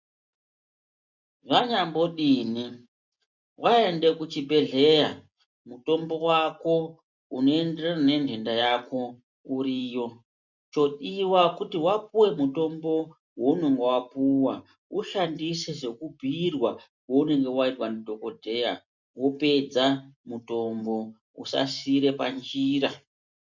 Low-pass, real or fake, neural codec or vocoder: 7.2 kHz; real; none